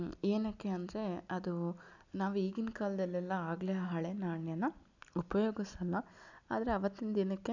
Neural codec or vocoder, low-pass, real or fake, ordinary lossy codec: none; 7.2 kHz; real; none